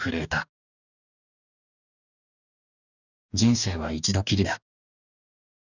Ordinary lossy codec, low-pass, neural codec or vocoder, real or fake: none; 7.2 kHz; codec, 44.1 kHz, 2.6 kbps, DAC; fake